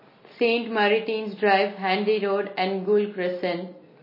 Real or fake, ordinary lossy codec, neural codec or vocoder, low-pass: real; MP3, 24 kbps; none; 5.4 kHz